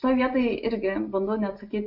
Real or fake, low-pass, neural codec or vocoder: real; 5.4 kHz; none